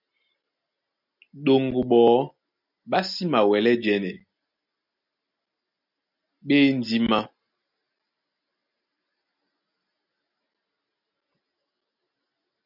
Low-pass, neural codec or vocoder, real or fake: 5.4 kHz; none; real